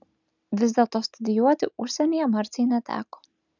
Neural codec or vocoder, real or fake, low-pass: none; real; 7.2 kHz